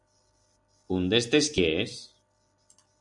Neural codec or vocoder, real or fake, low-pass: none; real; 10.8 kHz